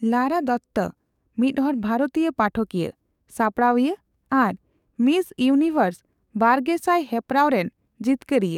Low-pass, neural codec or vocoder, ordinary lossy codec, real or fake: 19.8 kHz; codec, 44.1 kHz, 7.8 kbps, DAC; none; fake